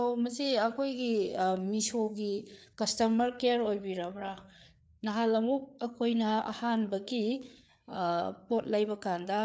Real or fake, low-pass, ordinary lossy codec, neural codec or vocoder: fake; none; none; codec, 16 kHz, 4 kbps, FreqCodec, larger model